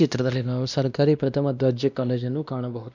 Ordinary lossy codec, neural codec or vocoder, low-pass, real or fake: none; codec, 16 kHz, 1 kbps, X-Codec, WavLM features, trained on Multilingual LibriSpeech; 7.2 kHz; fake